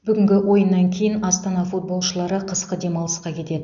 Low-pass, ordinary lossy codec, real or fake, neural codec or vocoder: 7.2 kHz; none; real; none